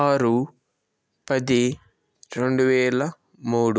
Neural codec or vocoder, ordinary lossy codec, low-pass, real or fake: none; none; none; real